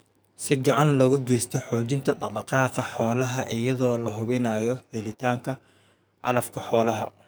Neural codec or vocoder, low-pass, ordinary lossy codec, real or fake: codec, 44.1 kHz, 2.6 kbps, SNAC; none; none; fake